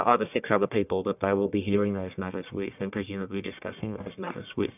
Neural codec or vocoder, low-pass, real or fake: codec, 44.1 kHz, 1.7 kbps, Pupu-Codec; 3.6 kHz; fake